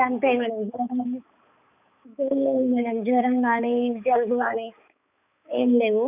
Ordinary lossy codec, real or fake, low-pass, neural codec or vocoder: none; fake; 3.6 kHz; codec, 16 kHz, 4 kbps, X-Codec, HuBERT features, trained on balanced general audio